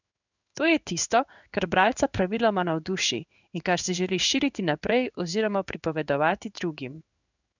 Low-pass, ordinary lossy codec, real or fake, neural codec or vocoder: 7.2 kHz; none; fake; codec, 16 kHz in and 24 kHz out, 1 kbps, XY-Tokenizer